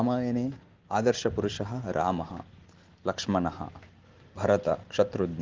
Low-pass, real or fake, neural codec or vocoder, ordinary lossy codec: 7.2 kHz; real; none; Opus, 16 kbps